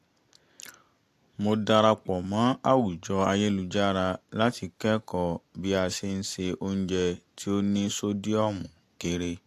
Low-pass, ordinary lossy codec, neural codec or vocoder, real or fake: 14.4 kHz; AAC, 64 kbps; none; real